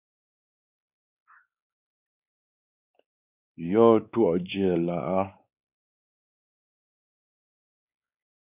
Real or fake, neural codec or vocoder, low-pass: fake; codec, 16 kHz, 4 kbps, X-Codec, WavLM features, trained on Multilingual LibriSpeech; 3.6 kHz